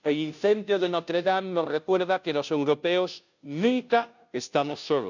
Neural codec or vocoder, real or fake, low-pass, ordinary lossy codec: codec, 16 kHz, 0.5 kbps, FunCodec, trained on Chinese and English, 25 frames a second; fake; 7.2 kHz; none